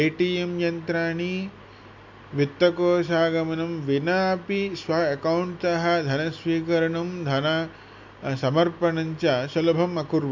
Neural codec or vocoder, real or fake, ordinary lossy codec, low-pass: none; real; MP3, 64 kbps; 7.2 kHz